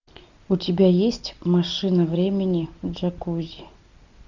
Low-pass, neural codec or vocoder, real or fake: 7.2 kHz; none; real